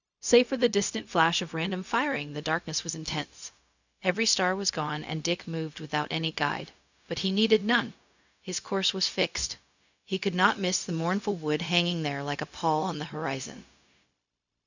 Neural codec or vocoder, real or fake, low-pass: codec, 16 kHz, 0.4 kbps, LongCat-Audio-Codec; fake; 7.2 kHz